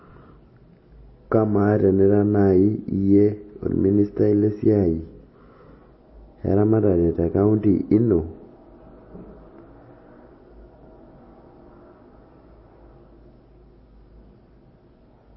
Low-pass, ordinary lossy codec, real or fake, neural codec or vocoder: 7.2 kHz; MP3, 24 kbps; real; none